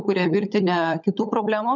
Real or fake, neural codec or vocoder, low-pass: fake; codec, 16 kHz, 16 kbps, FunCodec, trained on LibriTTS, 50 frames a second; 7.2 kHz